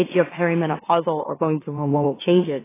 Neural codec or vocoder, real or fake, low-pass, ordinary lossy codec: autoencoder, 44.1 kHz, a latent of 192 numbers a frame, MeloTTS; fake; 3.6 kHz; AAC, 16 kbps